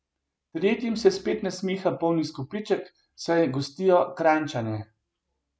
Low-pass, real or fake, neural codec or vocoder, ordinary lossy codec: none; real; none; none